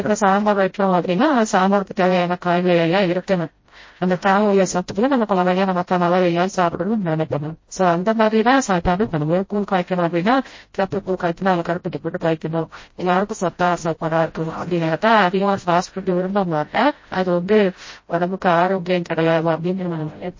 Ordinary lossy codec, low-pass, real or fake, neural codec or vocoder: MP3, 32 kbps; 7.2 kHz; fake; codec, 16 kHz, 0.5 kbps, FreqCodec, smaller model